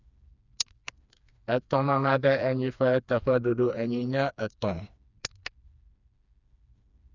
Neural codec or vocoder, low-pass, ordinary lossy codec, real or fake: codec, 16 kHz, 2 kbps, FreqCodec, smaller model; 7.2 kHz; none; fake